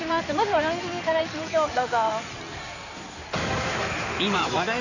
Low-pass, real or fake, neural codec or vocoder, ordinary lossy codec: 7.2 kHz; fake; codec, 16 kHz in and 24 kHz out, 2.2 kbps, FireRedTTS-2 codec; none